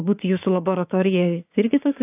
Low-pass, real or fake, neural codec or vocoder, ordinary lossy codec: 3.6 kHz; fake; codec, 16 kHz, 6 kbps, DAC; AAC, 32 kbps